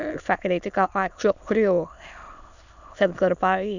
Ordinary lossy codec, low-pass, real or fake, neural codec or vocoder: none; 7.2 kHz; fake; autoencoder, 22.05 kHz, a latent of 192 numbers a frame, VITS, trained on many speakers